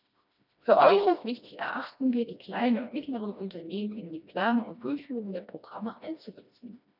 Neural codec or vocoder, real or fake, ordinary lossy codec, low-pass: codec, 16 kHz, 1 kbps, FreqCodec, smaller model; fake; none; 5.4 kHz